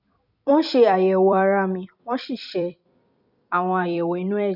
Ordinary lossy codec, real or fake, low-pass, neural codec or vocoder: none; fake; 5.4 kHz; vocoder, 44.1 kHz, 128 mel bands every 256 samples, BigVGAN v2